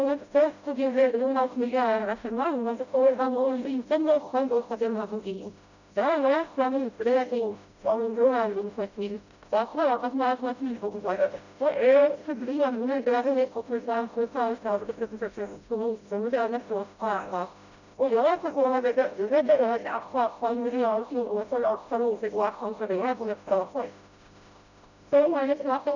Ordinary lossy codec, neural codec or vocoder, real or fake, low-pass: none; codec, 16 kHz, 0.5 kbps, FreqCodec, smaller model; fake; 7.2 kHz